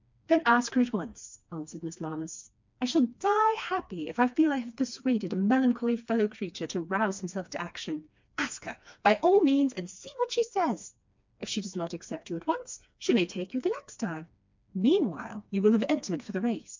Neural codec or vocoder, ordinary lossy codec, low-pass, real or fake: codec, 16 kHz, 2 kbps, FreqCodec, smaller model; MP3, 64 kbps; 7.2 kHz; fake